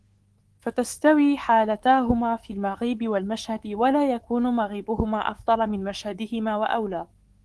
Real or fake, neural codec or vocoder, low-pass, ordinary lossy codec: fake; codec, 24 kHz, 3.1 kbps, DualCodec; 10.8 kHz; Opus, 16 kbps